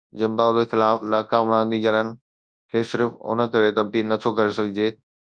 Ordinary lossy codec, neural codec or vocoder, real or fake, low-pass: Opus, 64 kbps; codec, 24 kHz, 0.9 kbps, WavTokenizer, large speech release; fake; 9.9 kHz